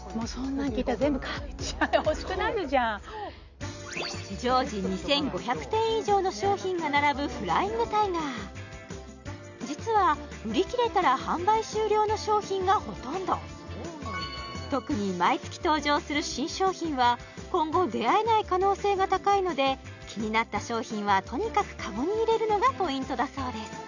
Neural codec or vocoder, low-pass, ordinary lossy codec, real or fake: none; 7.2 kHz; none; real